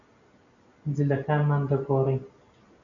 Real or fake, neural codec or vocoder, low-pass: real; none; 7.2 kHz